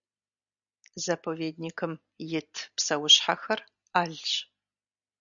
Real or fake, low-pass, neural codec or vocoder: real; 7.2 kHz; none